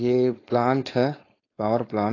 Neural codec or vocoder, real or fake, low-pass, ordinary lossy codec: codec, 16 kHz, 4.8 kbps, FACodec; fake; 7.2 kHz; AAC, 32 kbps